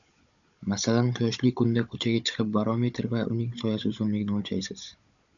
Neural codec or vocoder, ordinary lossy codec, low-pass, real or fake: codec, 16 kHz, 16 kbps, FunCodec, trained on Chinese and English, 50 frames a second; MP3, 96 kbps; 7.2 kHz; fake